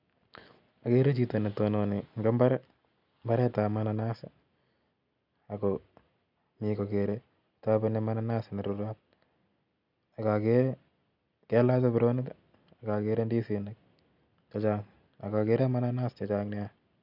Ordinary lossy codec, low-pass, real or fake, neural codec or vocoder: none; 5.4 kHz; real; none